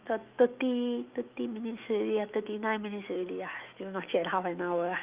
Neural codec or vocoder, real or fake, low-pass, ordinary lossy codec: autoencoder, 48 kHz, 128 numbers a frame, DAC-VAE, trained on Japanese speech; fake; 3.6 kHz; Opus, 24 kbps